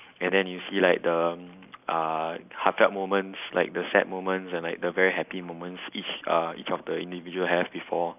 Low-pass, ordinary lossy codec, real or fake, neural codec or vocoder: 3.6 kHz; none; real; none